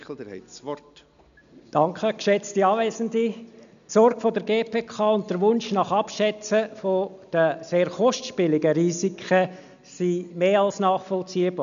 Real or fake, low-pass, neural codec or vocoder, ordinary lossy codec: real; 7.2 kHz; none; none